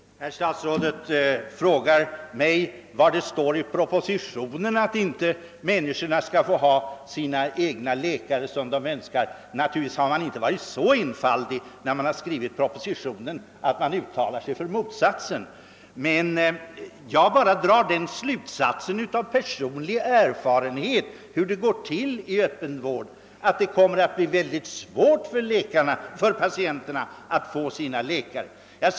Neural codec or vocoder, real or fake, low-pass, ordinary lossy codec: none; real; none; none